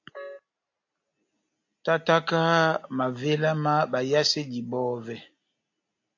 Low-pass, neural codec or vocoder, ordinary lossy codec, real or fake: 7.2 kHz; none; AAC, 48 kbps; real